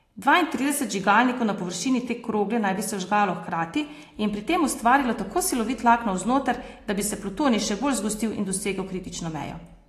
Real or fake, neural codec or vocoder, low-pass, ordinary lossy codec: real; none; 14.4 kHz; AAC, 48 kbps